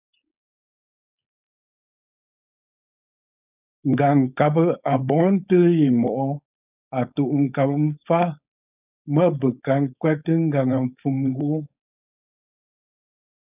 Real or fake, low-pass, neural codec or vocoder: fake; 3.6 kHz; codec, 16 kHz, 4.8 kbps, FACodec